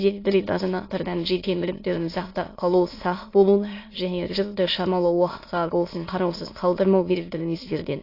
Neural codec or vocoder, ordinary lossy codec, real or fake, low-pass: autoencoder, 22.05 kHz, a latent of 192 numbers a frame, VITS, trained on many speakers; AAC, 32 kbps; fake; 5.4 kHz